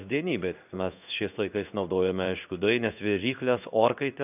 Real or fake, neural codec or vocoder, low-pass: fake; codec, 16 kHz in and 24 kHz out, 1 kbps, XY-Tokenizer; 3.6 kHz